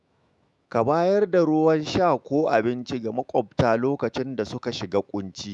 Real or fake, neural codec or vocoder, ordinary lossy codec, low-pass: fake; autoencoder, 48 kHz, 128 numbers a frame, DAC-VAE, trained on Japanese speech; none; 10.8 kHz